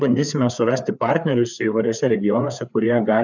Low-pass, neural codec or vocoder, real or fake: 7.2 kHz; codec, 16 kHz, 4 kbps, FreqCodec, larger model; fake